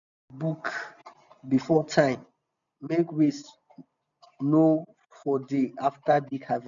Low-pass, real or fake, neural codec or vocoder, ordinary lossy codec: 7.2 kHz; real; none; none